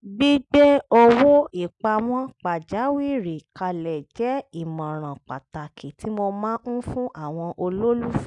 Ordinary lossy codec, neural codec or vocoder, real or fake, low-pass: none; none; real; 10.8 kHz